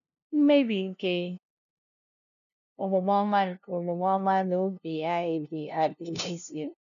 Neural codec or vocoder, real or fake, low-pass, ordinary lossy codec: codec, 16 kHz, 0.5 kbps, FunCodec, trained on LibriTTS, 25 frames a second; fake; 7.2 kHz; none